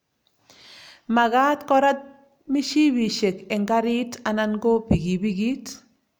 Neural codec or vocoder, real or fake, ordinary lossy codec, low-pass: none; real; none; none